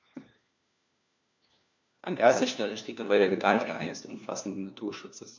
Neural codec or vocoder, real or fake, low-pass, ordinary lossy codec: codec, 16 kHz, 1 kbps, FunCodec, trained on LibriTTS, 50 frames a second; fake; 7.2 kHz; MP3, 64 kbps